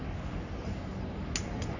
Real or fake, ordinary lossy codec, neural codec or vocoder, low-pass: fake; none; codec, 44.1 kHz, 3.4 kbps, Pupu-Codec; 7.2 kHz